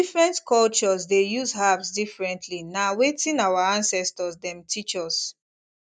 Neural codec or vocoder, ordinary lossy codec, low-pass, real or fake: none; none; 9.9 kHz; real